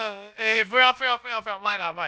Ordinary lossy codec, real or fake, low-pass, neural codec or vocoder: none; fake; none; codec, 16 kHz, about 1 kbps, DyCAST, with the encoder's durations